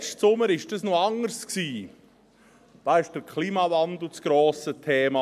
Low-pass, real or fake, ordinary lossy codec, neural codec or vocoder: 14.4 kHz; real; MP3, 96 kbps; none